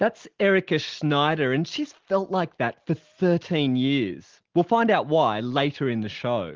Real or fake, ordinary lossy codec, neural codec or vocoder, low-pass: real; Opus, 16 kbps; none; 7.2 kHz